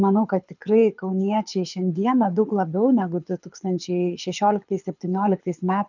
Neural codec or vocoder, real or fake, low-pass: codec, 24 kHz, 6 kbps, HILCodec; fake; 7.2 kHz